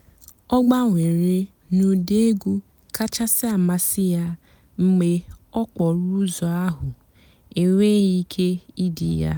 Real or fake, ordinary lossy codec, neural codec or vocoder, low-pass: real; none; none; none